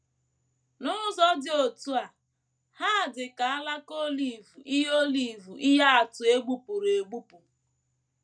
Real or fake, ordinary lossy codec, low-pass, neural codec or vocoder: real; none; 9.9 kHz; none